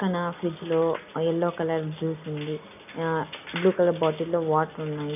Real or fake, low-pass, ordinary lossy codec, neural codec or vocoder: real; 3.6 kHz; none; none